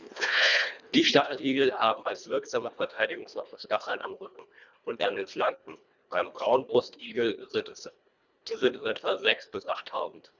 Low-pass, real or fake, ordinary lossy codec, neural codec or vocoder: 7.2 kHz; fake; none; codec, 24 kHz, 1.5 kbps, HILCodec